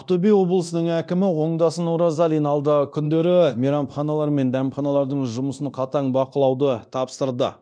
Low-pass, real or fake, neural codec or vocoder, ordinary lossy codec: 9.9 kHz; fake; codec, 24 kHz, 0.9 kbps, DualCodec; none